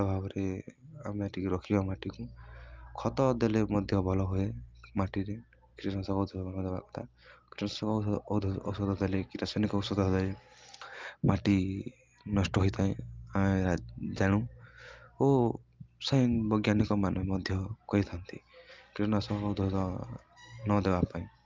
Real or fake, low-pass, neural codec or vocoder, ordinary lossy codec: real; 7.2 kHz; none; Opus, 32 kbps